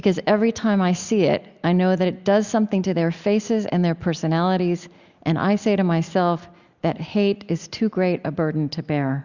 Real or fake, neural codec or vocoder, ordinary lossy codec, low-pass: real; none; Opus, 64 kbps; 7.2 kHz